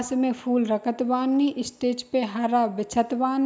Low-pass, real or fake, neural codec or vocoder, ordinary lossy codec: none; real; none; none